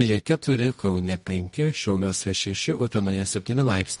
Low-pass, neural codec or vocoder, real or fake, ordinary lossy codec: 10.8 kHz; codec, 24 kHz, 0.9 kbps, WavTokenizer, medium music audio release; fake; MP3, 48 kbps